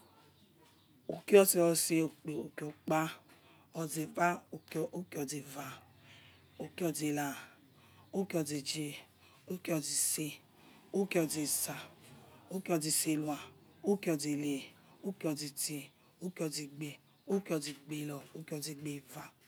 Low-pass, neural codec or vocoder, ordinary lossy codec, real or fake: none; none; none; real